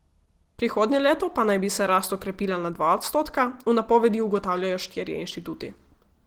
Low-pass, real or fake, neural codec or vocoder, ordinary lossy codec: 19.8 kHz; real; none; Opus, 16 kbps